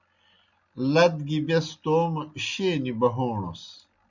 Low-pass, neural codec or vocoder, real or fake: 7.2 kHz; none; real